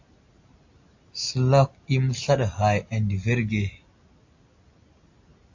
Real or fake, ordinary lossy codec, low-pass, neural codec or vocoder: real; AAC, 48 kbps; 7.2 kHz; none